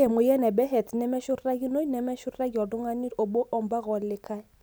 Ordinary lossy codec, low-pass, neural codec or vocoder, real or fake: none; none; none; real